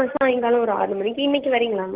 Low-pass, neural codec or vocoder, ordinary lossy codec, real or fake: 3.6 kHz; none; Opus, 16 kbps; real